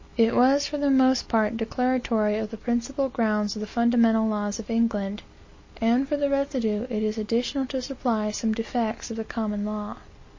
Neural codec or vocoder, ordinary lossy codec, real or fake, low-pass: none; MP3, 32 kbps; real; 7.2 kHz